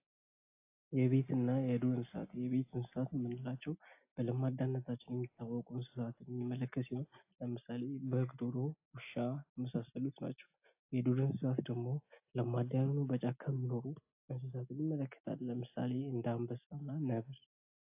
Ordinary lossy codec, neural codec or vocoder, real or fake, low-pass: AAC, 32 kbps; none; real; 3.6 kHz